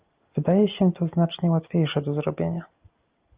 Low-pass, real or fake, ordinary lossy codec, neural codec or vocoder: 3.6 kHz; real; Opus, 24 kbps; none